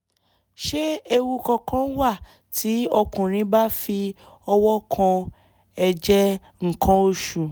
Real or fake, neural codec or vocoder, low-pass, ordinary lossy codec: real; none; none; none